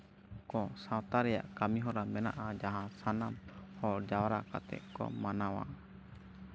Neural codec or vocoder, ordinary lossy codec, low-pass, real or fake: none; none; none; real